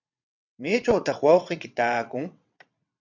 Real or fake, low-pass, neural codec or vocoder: fake; 7.2 kHz; vocoder, 22.05 kHz, 80 mel bands, WaveNeXt